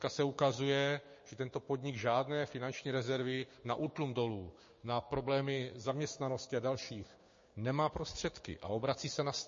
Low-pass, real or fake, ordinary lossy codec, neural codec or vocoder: 7.2 kHz; fake; MP3, 32 kbps; codec, 16 kHz, 6 kbps, DAC